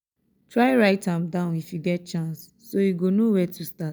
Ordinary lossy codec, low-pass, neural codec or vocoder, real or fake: none; none; none; real